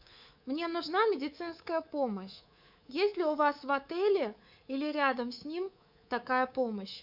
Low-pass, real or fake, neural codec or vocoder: 5.4 kHz; fake; codec, 24 kHz, 3.1 kbps, DualCodec